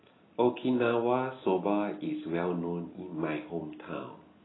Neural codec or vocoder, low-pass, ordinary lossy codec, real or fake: none; 7.2 kHz; AAC, 16 kbps; real